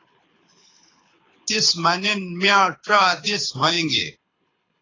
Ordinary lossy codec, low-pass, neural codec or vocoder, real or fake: AAC, 32 kbps; 7.2 kHz; codec, 24 kHz, 6 kbps, HILCodec; fake